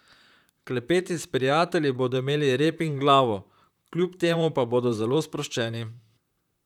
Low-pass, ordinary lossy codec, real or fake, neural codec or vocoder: 19.8 kHz; none; fake; vocoder, 44.1 kHz, 128 mel bands, Pupu-Vocoder